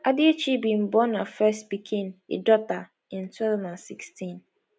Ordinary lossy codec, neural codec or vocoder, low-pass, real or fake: none; none; none; real